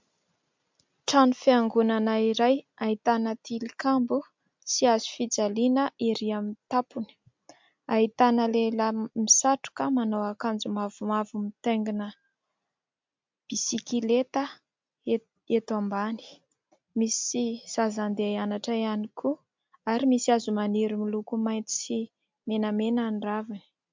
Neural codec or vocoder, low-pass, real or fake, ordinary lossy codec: none; 7.2 kHz; real; MP3, 64 kbps